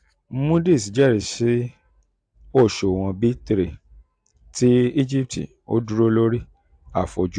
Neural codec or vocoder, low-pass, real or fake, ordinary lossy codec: none; 9.9 kHz; real; AAC, 64 kbps